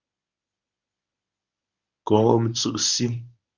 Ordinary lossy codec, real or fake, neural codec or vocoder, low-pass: Opus, 64 kbps; fake; codec, 24 kHz, 0.9 kbps, WavTokenizer, medium speech release version 1; 7.2 kHz